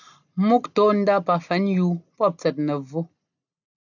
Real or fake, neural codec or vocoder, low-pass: real; none; 7.2 kHz